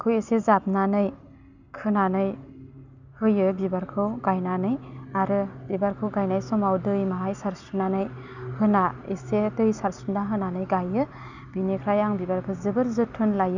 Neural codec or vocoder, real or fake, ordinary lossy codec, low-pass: none; real; none; 7.2 kHz